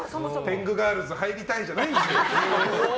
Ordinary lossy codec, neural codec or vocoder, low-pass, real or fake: none; none; none; real